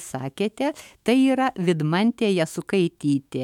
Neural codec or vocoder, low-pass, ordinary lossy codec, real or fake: autoencoder, 48 kHz, 128 numbers a frame, DAC-VAE, trained on Japanese speech; 19.8 kHz; MP3, 96 kbps; fake